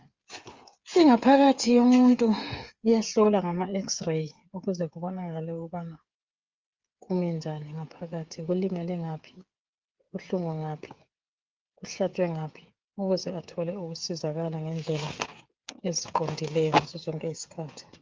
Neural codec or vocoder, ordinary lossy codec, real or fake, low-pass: codec, 16 kHz, 8 kbps, FreqCodec, smaller model; Opus, 32 kbps; fake; 7.2 kHz